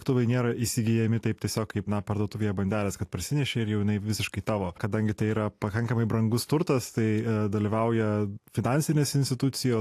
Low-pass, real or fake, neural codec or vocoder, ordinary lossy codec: 14.4 kHz; real; none; AAC, 64 kbps